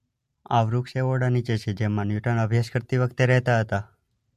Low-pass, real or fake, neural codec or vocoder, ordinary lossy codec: 14.4 kHz; real; none; MP3, 64 kbps